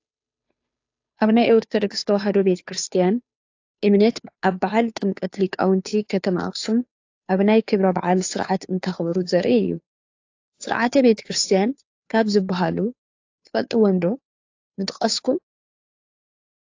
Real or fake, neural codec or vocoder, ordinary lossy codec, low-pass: fake; codec, 16 kHz, 2 kbps, FunCodec, trained on Chinese and English, 25 frames a second; AAC, 48 kbps; 7.2 kHz